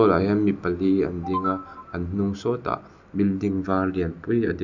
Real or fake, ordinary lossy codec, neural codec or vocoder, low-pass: real; none; none; 7.2 kHz